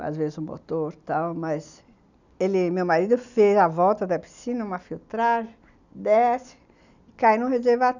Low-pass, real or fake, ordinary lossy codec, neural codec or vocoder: 7.2 kHz; real; none; none